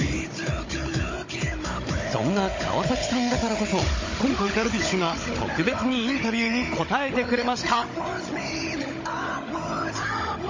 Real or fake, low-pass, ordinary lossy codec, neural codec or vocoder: fake; 7.2 kHz; MP3, 32 kbps; codec, 16 kHz, 16 kbps, FunCodec, trained on Chinese and English, 50 frames a second